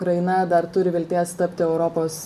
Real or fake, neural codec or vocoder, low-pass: real; none; 14.4 kHz